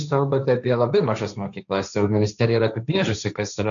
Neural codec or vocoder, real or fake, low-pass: codec, 16 kHz, 1.1 kbps, Voila-Tokenizer; fake; 7.2 kHz